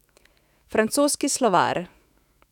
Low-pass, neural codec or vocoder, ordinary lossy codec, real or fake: 19.8 kHz; autoencoder, 48 kHz, 128 numbers a frame, DAC-VAE, trained on Japanese speech; none; fake